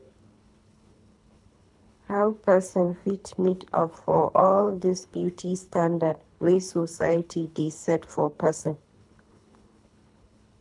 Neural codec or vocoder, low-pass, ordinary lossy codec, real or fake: codec, 24 kHz, 3 kbps, HILCodec; 10.8 kHz; none; fake